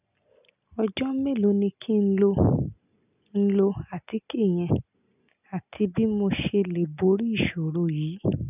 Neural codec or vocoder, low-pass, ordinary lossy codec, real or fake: none; 3.6 kHz; none; real